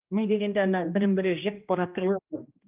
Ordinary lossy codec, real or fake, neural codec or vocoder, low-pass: Opus, 32 kbps; fake; codec, 16 kHz, 1 kbps, X-Codec, HuBERT features, trained on general audio; 3.6 kHz